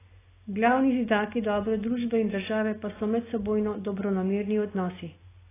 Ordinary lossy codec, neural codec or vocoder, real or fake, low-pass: AAC, 16 kbps; none; real; 3.6 kHz